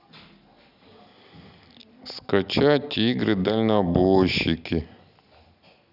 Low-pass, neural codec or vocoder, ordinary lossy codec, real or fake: 5.4 kHz; none; none; real